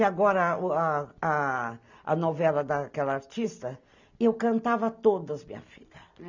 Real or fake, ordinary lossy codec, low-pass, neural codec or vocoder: real; none; 7.2 kHz; none